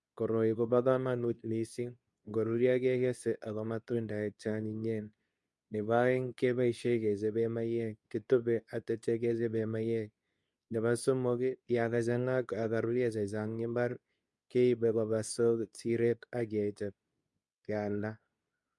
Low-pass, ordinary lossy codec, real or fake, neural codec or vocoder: none; none; fake; codec, 24 kHz, 0.9 kbps, WavTokenizer, medium speech release version 2